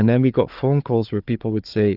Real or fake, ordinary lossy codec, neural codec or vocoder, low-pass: fake; Opus, 24 kbps; codec, 16 kHz, 4 kbps, FunCodec, trained on Chinese and English, 50 frames a second; 5.4 kHz